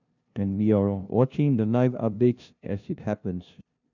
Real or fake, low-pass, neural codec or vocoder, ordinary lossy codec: fake; 7.2 kHz; codec, 16 kHz, 0.5 kbps, FunCodec, trained on LibriTTS, 25 frames a second; none